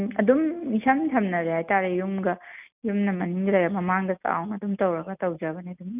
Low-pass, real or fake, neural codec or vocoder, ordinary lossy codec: 3.6 kHz; real; none; none